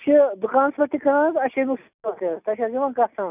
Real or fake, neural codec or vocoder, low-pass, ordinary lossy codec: real; none; 3.6 kHz; none